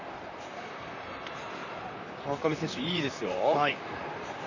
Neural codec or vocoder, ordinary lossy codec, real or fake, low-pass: vocoder, 44.1 kHz, 128 mel bands, Pupu-Vocoder; none; fake; 7.2 kHz